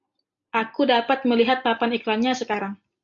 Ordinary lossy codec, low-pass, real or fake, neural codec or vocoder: AAC, 64 kbps; 7.2 kHz; real; none